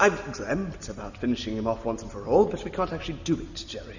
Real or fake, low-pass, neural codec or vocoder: real; 7.2 kHz; none